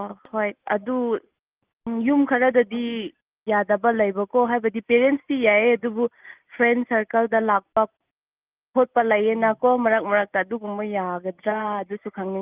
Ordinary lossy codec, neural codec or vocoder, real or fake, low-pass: Opus, 24 kbps; none; real; 3.6 kHz